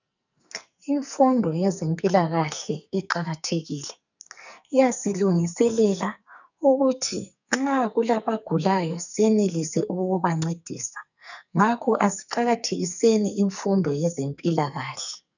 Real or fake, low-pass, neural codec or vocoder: fake; 7.2 kHz; codec, 44.1 kHz, 2.6 kbps, SNAC